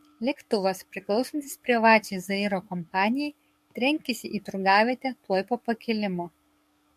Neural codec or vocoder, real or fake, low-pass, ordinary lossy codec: codec, 44.1 kHz, 7.8 kbps, DAC; fake; 14.4 kHz; MP3, 64 kbps